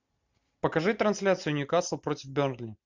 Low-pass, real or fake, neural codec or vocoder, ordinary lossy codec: 7.2 kHz; real; none; MP3, 64 kbps